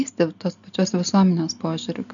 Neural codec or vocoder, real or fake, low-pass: none; real; 7.2 kHz